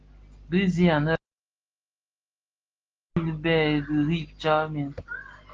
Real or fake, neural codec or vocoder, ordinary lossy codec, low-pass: real; none; Opus, 16 kbps; 7.2 kHz